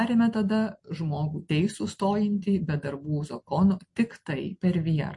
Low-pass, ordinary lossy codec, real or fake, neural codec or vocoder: 10.8 kHz; MP3, 48 kbps; real; none